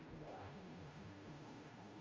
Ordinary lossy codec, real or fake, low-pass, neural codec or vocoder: Opus, 32 kbps; fake; 7.2 kHz; codec, 16 kHz, 0.5 kbps, FunCodec, trained on Chinese and English, 25 frames a second